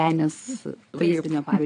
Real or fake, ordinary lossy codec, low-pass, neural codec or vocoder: fake; AAC, 64 kbps; 9.9 kHz; vocoder, 22.05 kHz, 80 mel bands, Vocos